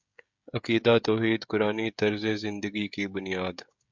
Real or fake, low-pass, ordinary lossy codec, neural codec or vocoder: fake; 7.2 kHz; MP3, 64 kbps; codec, 16 kHz, 16 kbps, FreqCodec, smaller model